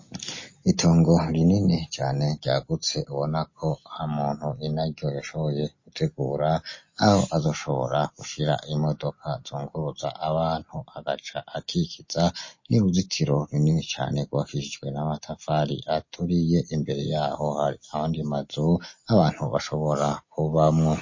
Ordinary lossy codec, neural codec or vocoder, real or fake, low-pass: MP3, 32 kbps; none; real; 7.2 kHz